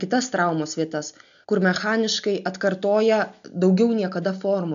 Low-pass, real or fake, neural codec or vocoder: 7.2 kHz; real; none